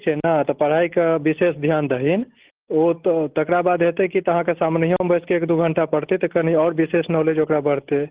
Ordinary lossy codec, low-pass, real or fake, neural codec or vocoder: Opus, 16 kbps; 3.6 kHz; real; none